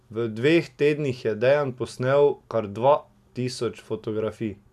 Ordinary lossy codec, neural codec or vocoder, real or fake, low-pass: none; none; real; 14.4 kHz